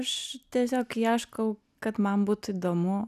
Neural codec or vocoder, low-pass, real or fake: none; 14.4 kHz; real